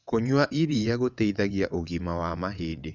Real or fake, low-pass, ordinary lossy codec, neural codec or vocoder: fake; 7.2 kHz; none; vocoder, 22.05 kHz, 80 mel bands, WaveNeXt